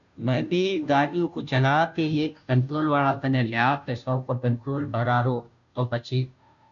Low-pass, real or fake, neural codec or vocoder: 7.2 kHz; fake; codec, 16 kHz, 0.5 kbps, FunCodec, trained on Chinese and English, 25 frames a second